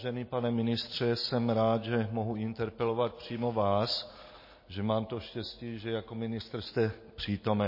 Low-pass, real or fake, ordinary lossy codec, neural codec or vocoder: 5.4 kHz; real; MP3, 24 kbps; none